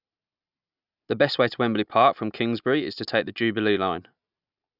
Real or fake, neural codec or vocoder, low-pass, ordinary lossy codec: real; none; 5.4 kHz; none